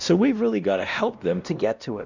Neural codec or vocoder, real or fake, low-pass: codec, 16 kHz, 1 kbps, X-Codec, WavLM features, trained on Multilingual LibriSpeech; fake; 7.2 kHz